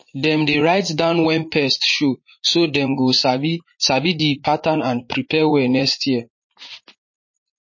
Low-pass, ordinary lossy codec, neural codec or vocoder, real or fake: 7.2 kHz; MP3, 32 kbps; vocoder, 44.1 kHz, 80 mel bands, Vocos; fake